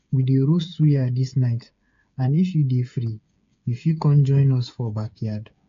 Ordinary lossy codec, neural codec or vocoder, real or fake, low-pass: AAC, 48 kbps; codec, 16 kHz, 8 kbps, FreqCodec, smaller model; fake; 7.2 kHz